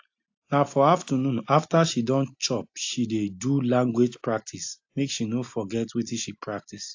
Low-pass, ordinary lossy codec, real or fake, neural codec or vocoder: 7.2 kHz; AAC, 48 kbps; real; none